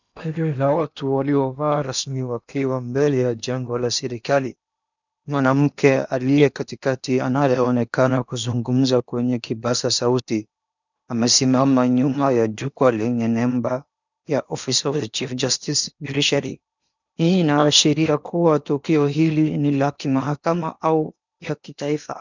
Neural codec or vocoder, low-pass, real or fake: codec, 16 kHz in and 24 kHz out, 0.8 kbps, FocalCodec, streaming, 65536 codes; 7.2 kHz; fake